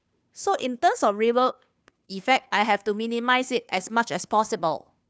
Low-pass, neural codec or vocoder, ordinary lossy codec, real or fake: none; codec, 16 kHz, 2 kbps, FunCodec, trained on Chinese and English, 25 frames a second; none; fake